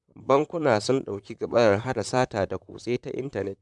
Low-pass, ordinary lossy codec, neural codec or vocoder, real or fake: 10.8 kHz; none; vocoder, 44.1 kHz, 128 mel bands, Pupu-Vocoder; fake